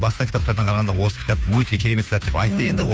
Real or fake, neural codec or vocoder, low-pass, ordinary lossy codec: fake; codec, 16 kHz, 2 kbps, FunCodec, trained on Chinese and English, 25 frames a second; none; none